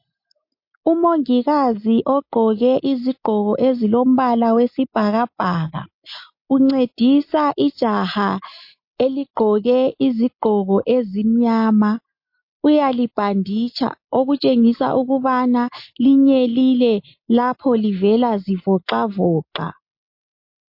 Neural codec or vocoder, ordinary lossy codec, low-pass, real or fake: none; MP3, 32 kbps; 5.4 kHz; real